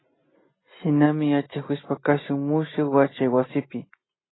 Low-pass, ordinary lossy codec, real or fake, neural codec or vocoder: 7.2 kHz; AAC, 16 kbps; real; none